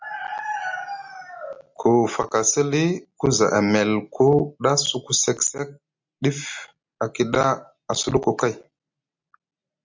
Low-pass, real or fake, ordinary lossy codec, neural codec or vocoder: 7.2 kHz; real; MP3, 64 kbps; none